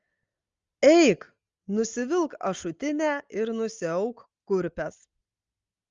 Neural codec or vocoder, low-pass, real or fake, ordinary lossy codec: none; 7.2 kHz; real; Opus, 32 kbps